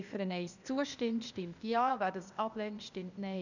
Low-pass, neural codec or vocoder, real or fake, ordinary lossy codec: 7.2 kHz; codec, 16 kHz, 0.8 kbps, ZipCodec; fake; none